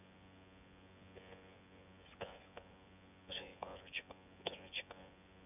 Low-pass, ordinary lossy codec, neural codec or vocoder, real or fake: 3.6 kHz; none; vocoder, 24 kHz, 100 mel bands, Vocos; fake